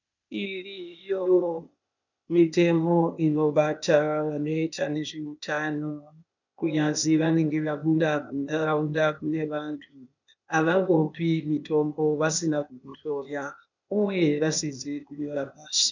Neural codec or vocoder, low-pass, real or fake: codec, 16 kHz, 0.8 kbps, ZipCodec; 7.2 kHz; fake